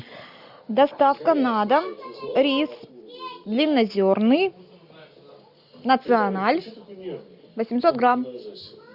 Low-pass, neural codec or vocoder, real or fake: 5.4 kHz; none; real